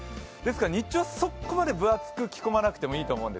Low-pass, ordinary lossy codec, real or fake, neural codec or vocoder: none; none; real; none